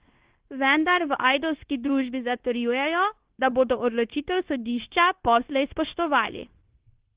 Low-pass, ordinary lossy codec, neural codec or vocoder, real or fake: 3.6 kHz; Opus, 16 kbps; codec, 16 kHz, 0.9 kbps, LongCat-Audio-Codec; fake